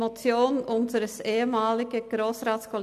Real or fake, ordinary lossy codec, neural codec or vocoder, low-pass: real; none; none; 14.4 kHz